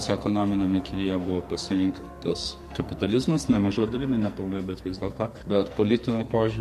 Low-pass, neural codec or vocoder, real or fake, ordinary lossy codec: 14.4 kHz; codec, 44.1 kHz, 2.6 kbps, SNAC; fake; MP3, 64 kbps